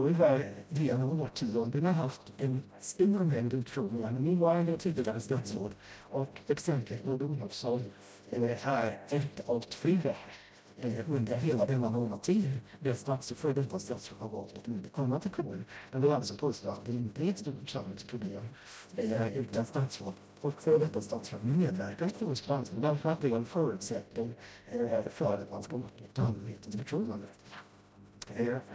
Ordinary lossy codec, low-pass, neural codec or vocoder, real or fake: none; none; codec, 16 kHz, 0.5 kbps, FreqCodec, smaller model; fake